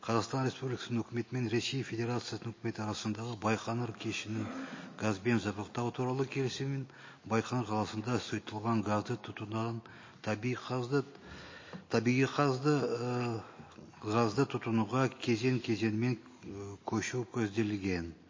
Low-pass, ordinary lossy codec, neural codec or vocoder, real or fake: 7.2 kHz; MP3, 32 kbps; none; real